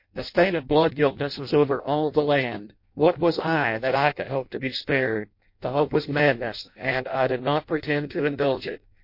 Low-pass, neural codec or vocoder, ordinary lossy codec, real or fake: 5.4 kHz; codec, 16 kHz in and 24 kHz out, 0.6 kbps, FireRedTTS-2 codec; MP3, 32 kbps; fake